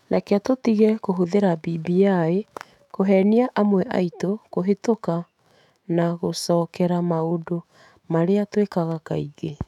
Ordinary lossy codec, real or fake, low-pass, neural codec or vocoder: none; fake; 19.8 kHz; autoencoder, 48 kHz, 128 numbers a frame, DAC-VAE, trained on Japanese speech